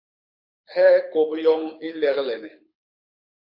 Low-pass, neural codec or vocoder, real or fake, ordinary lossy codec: 5.4 kHz; codec, 24 kHz, 6 kbps, HILCodec; fake; MP3, 32 kbps